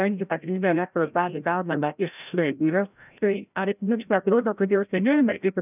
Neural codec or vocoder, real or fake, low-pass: codec, 16 kHz, 0.5 kbps, FreqCodec, larger model; fake; 3.6 kHz